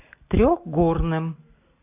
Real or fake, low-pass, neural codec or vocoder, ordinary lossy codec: real; 3.6 kHz; none; AAC, 24 kbps